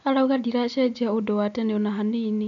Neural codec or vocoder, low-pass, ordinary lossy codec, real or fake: none; 7.2 kHz; none; real